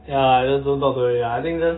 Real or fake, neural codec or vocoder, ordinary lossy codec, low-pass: real; none; AAC, 16 kbps; 7.2 kHz